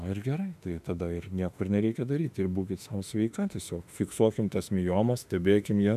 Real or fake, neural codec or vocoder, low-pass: fake; autoencoder, 48 kHz, 32 numbers a frame, DAC-VAE, trained on Japanese speech; 14.4 kHz